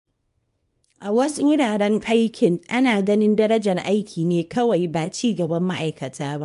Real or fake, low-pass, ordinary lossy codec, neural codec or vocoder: fake; 10.8 kHz; MP3, 64 kbps; codec, 24 kHz, 0.9 kbps, WavTokenizer, small release